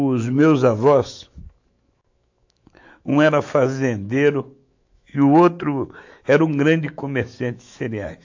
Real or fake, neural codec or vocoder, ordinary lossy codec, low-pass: fake; autoencoder, 48 kHz, 128 numbers a frame, DAC-VAE, trained on Japanese speech; AAC, 48 kbps; 7.2 kHz